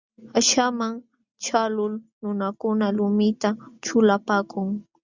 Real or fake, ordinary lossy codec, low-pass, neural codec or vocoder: real; Opus, 64 kbps; 7.2 kHz; none